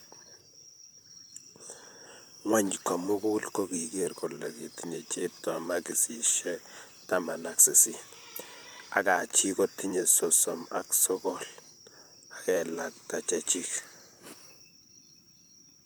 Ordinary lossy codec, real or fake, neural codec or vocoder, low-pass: none; fake; vocoder, 44.1 kHz, 128 mel bands, Pupu-Vocoder; none